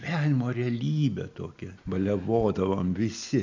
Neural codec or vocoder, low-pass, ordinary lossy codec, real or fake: none; 7.2 kHz; MP3, 64 kbps; real